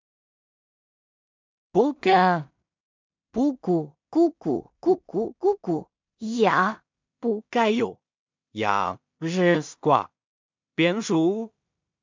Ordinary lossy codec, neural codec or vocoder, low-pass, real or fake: MP3, 64 kbps; codec, 16 kHz in and 24 kHz out, 0.4 kbps, LongCat-Audio-Codec, two codebook decoder; 7.2 kHz; fake